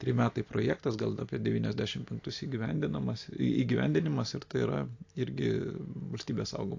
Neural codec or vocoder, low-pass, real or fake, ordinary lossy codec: none; 7.2 kHz; real; AAC, 48 kbps